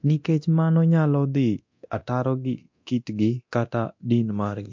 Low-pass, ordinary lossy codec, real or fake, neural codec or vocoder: 7.2 kHz; MP3, 48 kbps; fake; codec, 24 kHz, 0.9 kbps, DualCodec